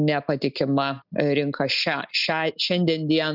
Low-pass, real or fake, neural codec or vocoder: 5.4 kHz; real; none